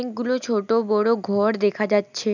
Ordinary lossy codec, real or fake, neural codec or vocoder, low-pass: none; real; none; 7.2 kHz